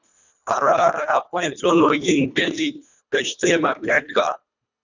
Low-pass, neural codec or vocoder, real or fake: 7.2 kHz; codec, 24 kHz, 1.5 kbps, HILCodec; fake